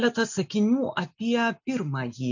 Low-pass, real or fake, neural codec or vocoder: 7.2 kHz; real; none